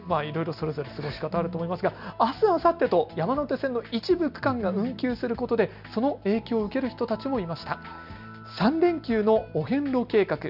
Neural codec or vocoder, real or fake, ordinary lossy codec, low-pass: none; real; none; 5.4 kHz